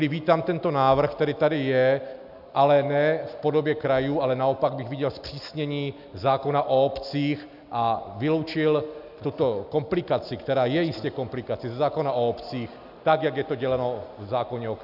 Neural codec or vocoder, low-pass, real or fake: none; 5.4 kHz; real